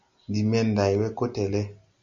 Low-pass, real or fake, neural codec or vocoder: 7.2 kHz; real; none